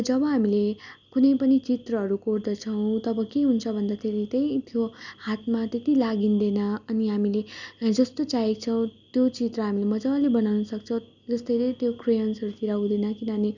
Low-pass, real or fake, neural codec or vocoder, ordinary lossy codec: 7.2 kHz; real; none; none